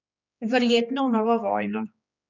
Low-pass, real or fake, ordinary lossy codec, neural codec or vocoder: 7.2 kHz; fake; none; codec, 16 kHz, 2 kbps, X-Codec, HuBERT features, trained on general audio